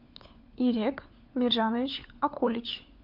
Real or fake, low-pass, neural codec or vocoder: fake; 5.4 kHz; codec, 16 kHz, 4 kbps, FunCodec, trained on LibriTTS, 50 frames a second